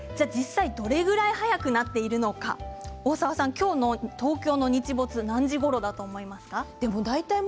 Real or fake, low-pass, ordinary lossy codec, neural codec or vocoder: real; none; none; none